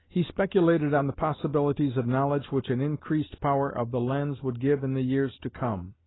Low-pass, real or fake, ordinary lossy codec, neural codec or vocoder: 7.2 kHz; real; AAC, 16 kbps; none